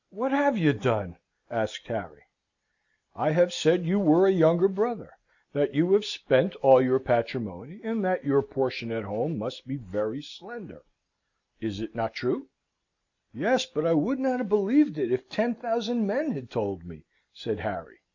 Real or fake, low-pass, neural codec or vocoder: real; 7.2 kHz; none